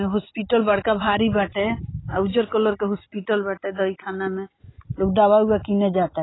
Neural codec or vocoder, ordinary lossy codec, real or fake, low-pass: none; AAC, 16 kbps; real; 7.2 kHz